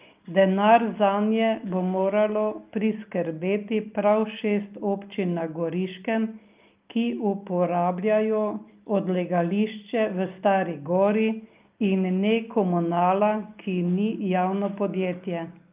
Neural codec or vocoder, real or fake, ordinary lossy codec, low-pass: none; real; Opus, 24 kbps; 3.6 kHz